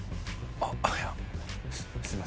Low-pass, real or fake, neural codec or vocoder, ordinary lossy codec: none; real; none; none